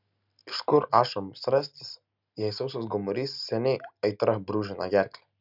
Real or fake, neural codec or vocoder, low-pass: real; none; 5.4 kHz